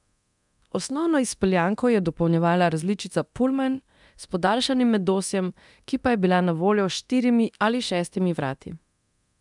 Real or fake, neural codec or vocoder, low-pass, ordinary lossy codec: fake; codec, 24 kHz, 0.9 kbps, DualCodec; 10.8 kHz; none